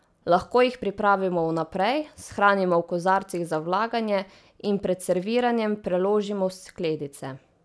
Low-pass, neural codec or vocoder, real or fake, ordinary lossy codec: none; none; real; none